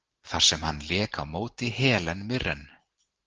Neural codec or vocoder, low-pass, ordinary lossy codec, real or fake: none; 7.2 kHz; Opus, 16 kbps; real